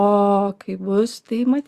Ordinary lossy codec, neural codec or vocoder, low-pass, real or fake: Opus, 64 kbps; none; 14.4 kHz; real